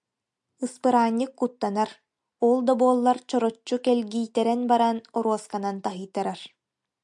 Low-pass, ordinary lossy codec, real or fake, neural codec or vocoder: 10.8 kHz; MP3, 96 kbps; real; none